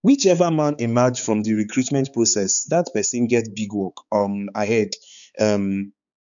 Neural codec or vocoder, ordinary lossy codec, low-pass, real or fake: codec, 16 kHz, 4 kbps, X-Codec, HuBERT features, trained on balanced general audio; none; 7.2 kHz; fake